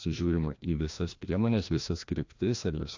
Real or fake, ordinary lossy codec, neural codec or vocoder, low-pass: fake; AAC, 48 kbps; codec, 16 kHz, 1 kbps, FreqCodec, larger model; 7.2 kHz